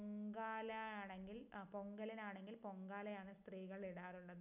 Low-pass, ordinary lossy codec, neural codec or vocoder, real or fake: 3.6 kHz; none; none; real